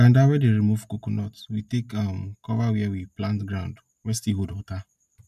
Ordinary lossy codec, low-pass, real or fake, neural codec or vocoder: none; 14.4 kHz; real; none